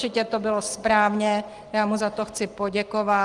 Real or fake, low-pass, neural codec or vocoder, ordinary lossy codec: real; 10.8 kHz; none; Opus, 24 kbps